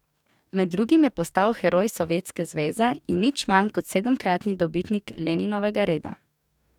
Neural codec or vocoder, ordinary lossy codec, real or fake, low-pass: codec, 44.1 kHz, 2.6 kbps, DAC; none; fake; 19.8 kHz